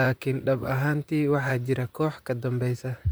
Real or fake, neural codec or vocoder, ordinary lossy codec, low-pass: fake; vocoder, 44.1 kHz, 128 mel bands, Pupu-Vocoder; none; none